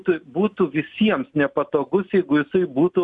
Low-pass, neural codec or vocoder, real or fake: 10.8 kHz; none; real